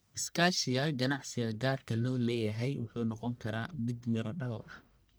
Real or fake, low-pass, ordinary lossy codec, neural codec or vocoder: fake; none; none; codec, 44.1 kHz, 1.7 kbps, Pupu-Codec